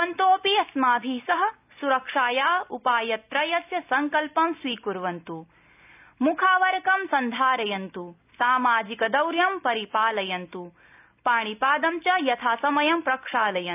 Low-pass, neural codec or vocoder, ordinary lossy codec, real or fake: 3.6 kHz; none; none; real